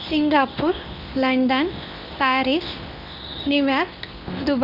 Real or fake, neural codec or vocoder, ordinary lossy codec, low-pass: fake; codec, 24 kHz, 0.9 kbps, WavTokenizer, medium speech release version 2; none; 5.4 kHz